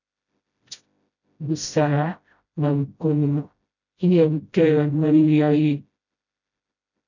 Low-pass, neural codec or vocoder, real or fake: 7.2 kHz; codec, 16 kHz, 0.5 kbps, FreqCodec, smaller model; fake